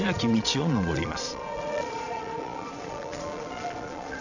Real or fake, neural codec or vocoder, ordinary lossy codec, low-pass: fake; vocoder, 22.05 kHz, 80 mel bands, Vocos; none; 7.2 kHz